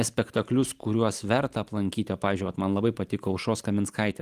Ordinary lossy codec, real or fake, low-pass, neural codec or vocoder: Opus, 24 kbps; real; 14.4 kHz; none